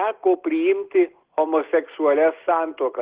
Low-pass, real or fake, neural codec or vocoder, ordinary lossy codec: 3.6 kHz; real; none; Opus, 16 kbps